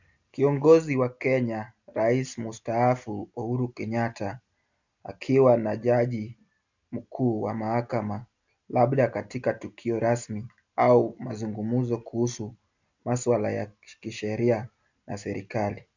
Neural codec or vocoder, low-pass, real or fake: none; 7.2 kHz; real